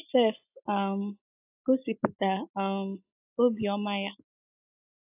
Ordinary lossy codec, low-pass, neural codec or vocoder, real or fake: AAC, 32 kbps; 3.6 kHz; none; real